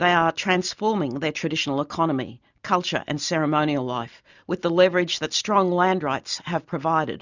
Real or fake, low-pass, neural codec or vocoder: real; 7.2 kHz; none